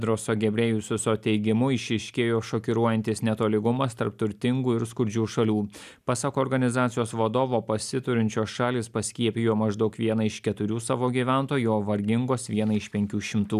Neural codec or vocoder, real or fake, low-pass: none; real; 14.4 kHz